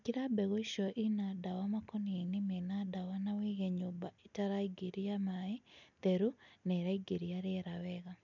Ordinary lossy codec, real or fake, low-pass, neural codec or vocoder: none; real; 7.2 kHz; none